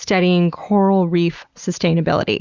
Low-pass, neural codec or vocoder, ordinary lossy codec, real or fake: 7.2 kHz; none; Opus, 64 kbps; real